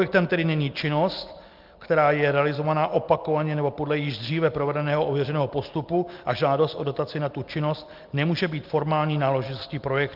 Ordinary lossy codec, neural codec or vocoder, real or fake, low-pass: Opus, 32 kbps; none; real; 5.4 kHz